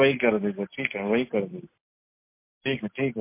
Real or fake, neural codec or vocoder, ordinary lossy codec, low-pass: real; none; MP3, 24 kbps; 3.6 kHz